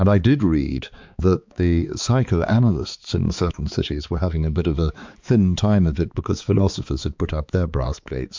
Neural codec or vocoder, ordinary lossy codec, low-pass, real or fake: codec, 16 kHz, 4 kbps, X-Codec, HuBERT features, trained on balanced general audio; MP3, 64 kbps; 7.2 kHz; fake